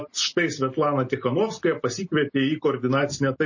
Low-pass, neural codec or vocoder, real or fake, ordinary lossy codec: 7.2 kHz; none; real; MP3, 32 kbps